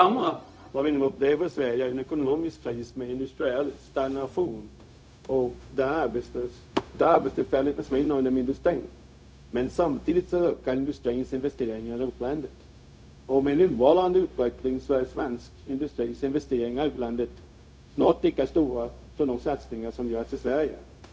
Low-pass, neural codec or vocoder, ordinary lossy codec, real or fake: none; codec, 16 kHz, 0.4 kbps, LongCat-Audio-Codec; none; fake